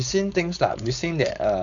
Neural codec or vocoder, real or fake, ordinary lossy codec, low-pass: codec, 16 kHz, 4.8 kbps, FACodec; fake; none; 7.2 kHz